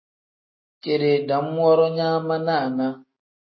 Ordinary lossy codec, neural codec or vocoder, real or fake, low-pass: MP3, 24 kbps; none; real; 7.2 kHz